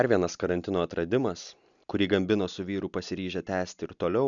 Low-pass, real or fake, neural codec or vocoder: 7.2 kHz; real; none